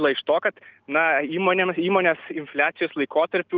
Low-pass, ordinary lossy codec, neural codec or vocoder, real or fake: 7.2 kHz; Opus, 32 kbps; none; real